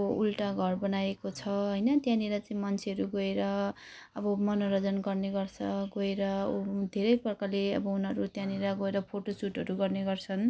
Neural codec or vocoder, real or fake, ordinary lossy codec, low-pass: none; real; none; none